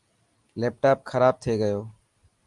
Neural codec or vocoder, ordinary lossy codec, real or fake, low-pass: none; Opus, 32 kbps; real; 10.8 kHz